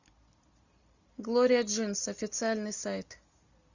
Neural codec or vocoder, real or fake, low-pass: none; real; 7.2 kHz